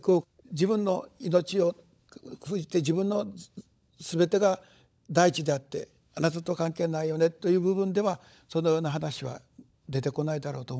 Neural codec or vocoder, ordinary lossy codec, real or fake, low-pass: codec, 16 kHz, 16 kbps, FunCodec, trained on LibriTTS, 50 frames a second; none; fake; none